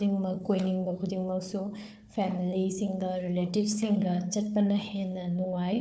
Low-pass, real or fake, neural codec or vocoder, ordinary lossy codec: none; fake; codec, 16 kHz, 4 kbps, FunCodec, trained on Chinese and English, 50 frames a second; none